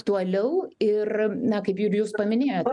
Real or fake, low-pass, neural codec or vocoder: real; 10.8 kHz; none